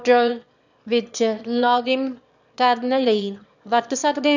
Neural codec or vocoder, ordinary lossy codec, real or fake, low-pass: autoencoder, 22.05 kHz, a latent of 192 numbers a frame, VITS, trained on one speaker; none; fake; 7.2 kHz